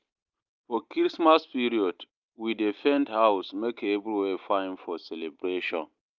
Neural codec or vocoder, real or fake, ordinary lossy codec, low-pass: none; real; Opus, 24 kbps; 7.2 kHz